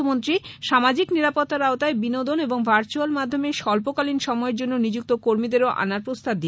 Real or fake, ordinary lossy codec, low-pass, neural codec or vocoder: real; none; none; none